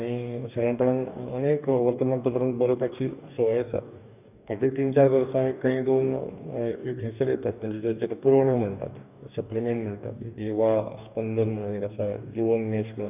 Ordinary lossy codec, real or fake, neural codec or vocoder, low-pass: none; fake; codec, 44.1 kHz, 2.6 kbps, DAC; 3.6 kHz